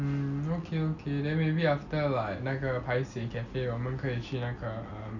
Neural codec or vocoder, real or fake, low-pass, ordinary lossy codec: none; real; 7.2 kHz; none